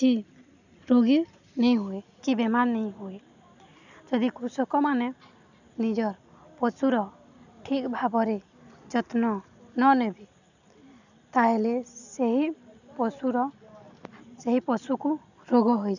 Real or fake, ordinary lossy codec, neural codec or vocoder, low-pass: real; none; none; 7.2 kHz